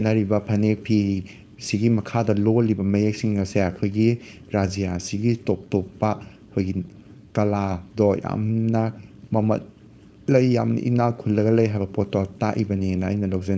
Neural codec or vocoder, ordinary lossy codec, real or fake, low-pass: codec, 16 kHz, 4.8 kbps, FACodec; none; fake; none